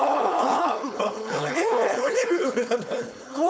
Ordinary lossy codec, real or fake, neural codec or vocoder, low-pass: none; fake; codec, 16 kHz, 4.8 kbps, FACodec; none